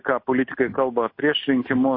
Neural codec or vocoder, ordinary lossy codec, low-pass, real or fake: none; MP3, 32 kbps; 5.4 kHz; real